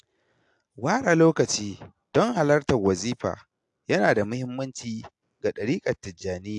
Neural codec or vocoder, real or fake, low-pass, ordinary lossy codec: none; real; 10.8 kHz; AAC, 64 kbps